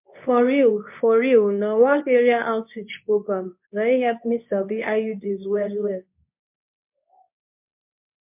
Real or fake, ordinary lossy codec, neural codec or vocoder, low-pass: fake; MP3, 32 kbps; codec, 24 kHz, 0.9 kbps, WavTokenizer, medium speech release version 2; 3.6 kHz